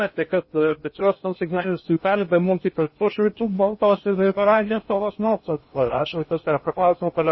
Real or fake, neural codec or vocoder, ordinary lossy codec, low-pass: fake; codec, 16 kHz in and 24 kHz out, 0.6 kbps, FocalCodec, streaming, 2048 codes; MP3, 24 kbps; 7.2 kHz